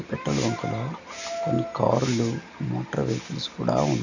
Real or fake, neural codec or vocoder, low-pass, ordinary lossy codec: real; none; 7.2 kHz; none